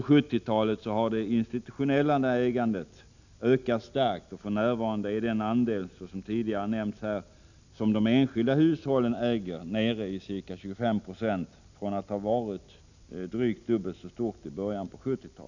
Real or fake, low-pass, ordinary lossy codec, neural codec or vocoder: real; 7.2 kHz; none; none